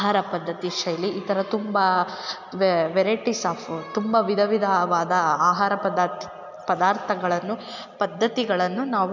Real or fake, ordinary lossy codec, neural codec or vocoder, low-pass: real; none; none; 7.2 kHz